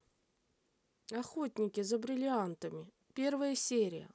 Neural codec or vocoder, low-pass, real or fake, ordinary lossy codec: none; none; real; none